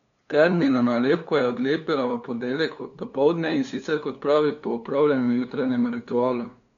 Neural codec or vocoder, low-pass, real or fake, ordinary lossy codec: codec, 16 kHz, 2 kbps, FunCodec, trained on LibriTTS, 25 frames a second; 7.2 kHz; fake; none